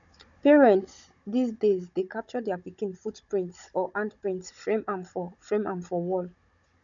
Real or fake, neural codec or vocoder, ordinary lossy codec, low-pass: fake; codec, 16 kHz, 16 kbps, FunCodec, trained on Chinese and English, 50 frames a second; none; 7.2 kHz